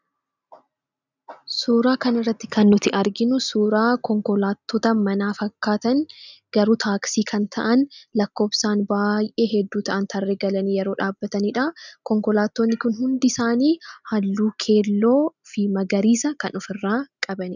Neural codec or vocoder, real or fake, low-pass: none; real; 7.2 kHz